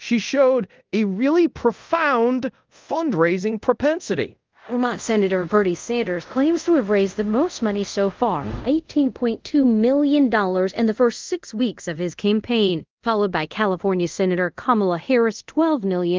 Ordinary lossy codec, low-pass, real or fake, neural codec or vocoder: Opus, 32 kbps; 7.2 kHz; fake; codec, 24 kHz, 0.5 kbps, DualCodec